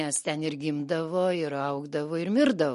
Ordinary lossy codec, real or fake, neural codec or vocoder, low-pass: MP3, 48 kbps; real; none; 14.4 kHz